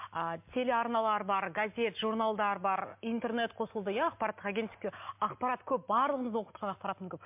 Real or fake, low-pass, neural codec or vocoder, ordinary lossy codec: real; 3.6 kHz; none; MP3, 32 kbps